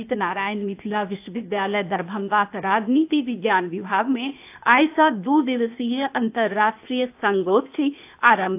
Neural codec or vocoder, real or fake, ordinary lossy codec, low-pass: codec, 16 kHz, 0.8 kbps, ZipCodec; fake; AAC, 32 kbps; 3.6 kHz